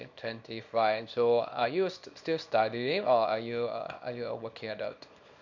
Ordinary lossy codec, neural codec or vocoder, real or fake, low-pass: none; codec, 24 kHz, 0.9 kbps, WavTokenizer, small release; fake; 7.2 kHz